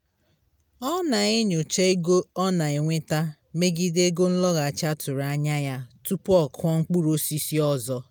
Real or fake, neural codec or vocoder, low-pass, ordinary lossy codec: real; none; none; none